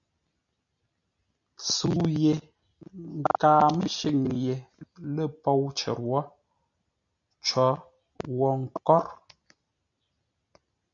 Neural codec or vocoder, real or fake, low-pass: none; real; 7.2 kHz